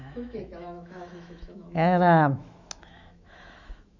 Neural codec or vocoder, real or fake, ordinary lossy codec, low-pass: autoencoder, 48 kHz, 128 numbers a frame, DAC-VAE, trained on Japanese speech; fake; none; 7.2 kHz